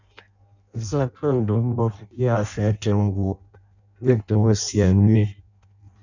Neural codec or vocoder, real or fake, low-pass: codec, 16 kHz in and 24 kHz out, 0.6 kbps, FireRedTTS-2 codec; fake; 7.2 kHz